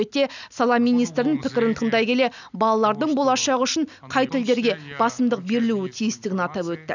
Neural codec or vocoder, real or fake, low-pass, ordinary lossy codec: none; real; 7.2 kHz; none